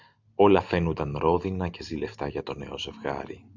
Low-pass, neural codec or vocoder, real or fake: 7.2 kHz; none; real